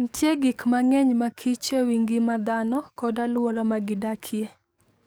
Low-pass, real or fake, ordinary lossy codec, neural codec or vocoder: none; fake; none; codec, 44.1 kHz, 7.8 kbps, DAC